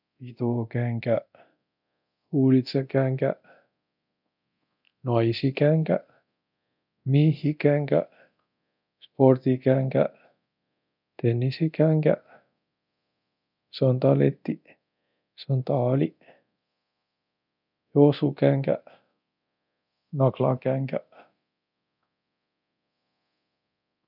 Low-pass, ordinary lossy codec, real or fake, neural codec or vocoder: 5.4 kHz; none; fake; codec, 24 kHz, 0.9 kbps, DualCodec